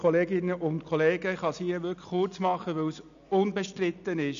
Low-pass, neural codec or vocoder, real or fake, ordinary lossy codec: 7.2 kHz; none; real; AAC, 96 kbps